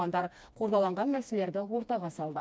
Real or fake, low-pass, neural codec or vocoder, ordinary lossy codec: fake; none; codec, 16 kHz, 2 kbps, FreqCodec, smaller model; none